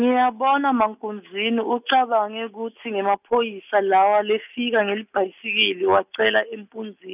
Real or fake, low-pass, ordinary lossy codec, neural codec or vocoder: real; 3.6 kHz; none; none